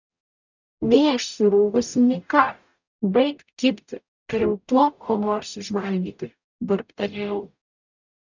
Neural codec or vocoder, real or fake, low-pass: codec, 44.1 kHz, 0.9 kbps, DAC; fake; 7.2 kHz